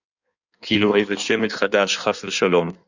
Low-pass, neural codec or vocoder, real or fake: 7.2 kHz; codec, 16 kHz in and 24 kHz out, 1.1 kbps, FireRedTTS-2 codec; fake